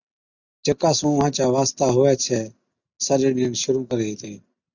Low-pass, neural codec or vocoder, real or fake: 7.2 kHz; none; real